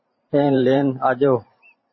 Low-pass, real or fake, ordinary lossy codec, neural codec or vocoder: 7.2 kHz; fake; MP3, 24 kbps; vocoder, 44.1 kHz, 128 mel bands every 512 samples, BigVGAN v2